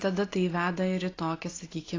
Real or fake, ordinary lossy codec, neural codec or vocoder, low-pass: real; AAC, 32 kbps; none; 7.2 kHz